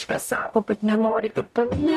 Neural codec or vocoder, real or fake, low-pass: codec, 44.1 kHz, 0.9 kbps, DAC; fake; 14.4 kHz